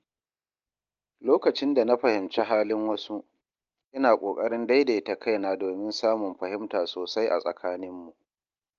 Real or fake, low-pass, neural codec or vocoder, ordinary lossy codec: real; 7.2 kHz; none; Opus, 24 kbps